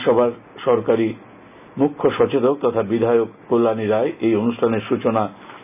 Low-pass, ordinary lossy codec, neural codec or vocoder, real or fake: 3.6 kHz; none; none; real